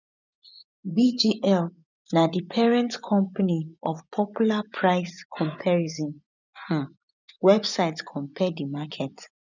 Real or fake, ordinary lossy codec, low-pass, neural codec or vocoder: fake; none; 7.2 kHz; vocoder, 24 kHz, 100 mel bands, Vocos